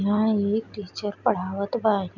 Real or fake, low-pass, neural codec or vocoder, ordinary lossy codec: real; 7.2 kHz; none; none